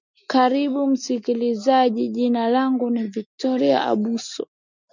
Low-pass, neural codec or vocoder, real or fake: 7.2 kHz; none; real